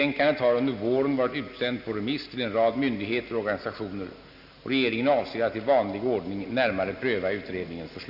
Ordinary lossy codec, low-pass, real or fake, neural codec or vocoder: none; 5.4 kHz; real; none